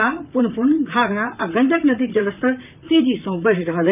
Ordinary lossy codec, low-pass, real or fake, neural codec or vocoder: none; 3.6 kHz; fake; vocoder, 44.1 kHz, 128 mel bands, Pupu-Vocoder